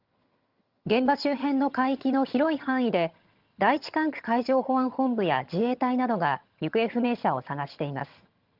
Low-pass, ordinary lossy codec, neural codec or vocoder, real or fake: 5.4 kHz; Opus, 24 kbps; vocoder, 22.05 kHz, 80 mel bands, HiFi-GAN; fake